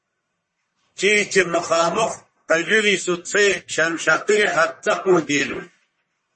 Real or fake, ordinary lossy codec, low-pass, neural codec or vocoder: fake; MP3, 32 kbps; 10.8 kHz; codec, 44.1 kHz, 1.7 kbps, Pupu-Codec